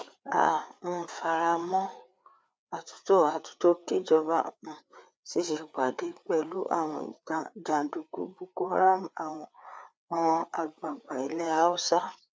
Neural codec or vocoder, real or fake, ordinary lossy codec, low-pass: codec, 16 kHz, 4 kbps, FreqCodec, larger model; fake; none; none